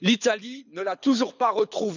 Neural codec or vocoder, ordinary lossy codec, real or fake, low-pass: codec, 24 kHz, 6 kbps, HILCodec; none; fake; 7.2 kHz